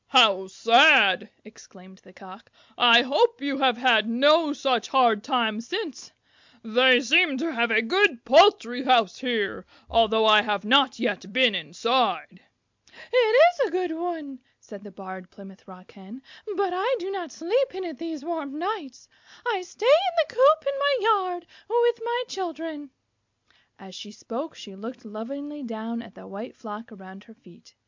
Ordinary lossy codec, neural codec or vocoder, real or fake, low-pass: MP3, 64 kbps; none; real; 7.2 kHz